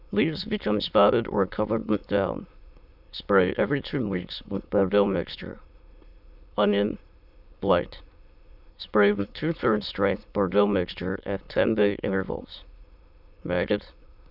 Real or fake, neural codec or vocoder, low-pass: fake; autoencoder, 22.05 kHz, a latent of 192 numbers a frame, VITS, trained on many speakers; 5.4 kHz